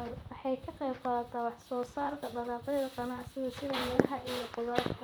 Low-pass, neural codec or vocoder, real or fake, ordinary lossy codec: none; vocoder, 44.1 kHz, 128 mel bands, Pupu-Vocoder; fake; none